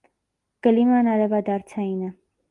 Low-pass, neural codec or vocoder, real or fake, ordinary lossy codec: 10.8 kHz; none; real; Opus, 24 kbps